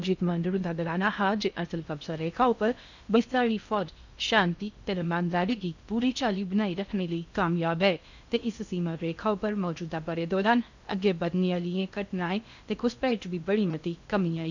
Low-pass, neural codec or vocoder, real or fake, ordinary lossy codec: 7.2 kHz; codec, 16 kHz in and 24 kHz out, 0.6 kbps, FocalCodec, streaming, 2048 codes; fake; none